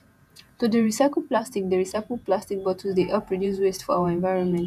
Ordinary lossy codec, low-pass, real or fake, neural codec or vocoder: none; 14.4 kHz; fake; vocoder, 48 kHz, 128 mel bands, Vocos